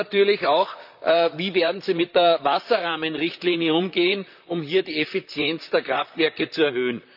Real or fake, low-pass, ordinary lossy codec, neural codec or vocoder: fake; 5.4 kHz; none; vocoder, 44.1 kHz, 128 mel bands, Pupu-Vocoder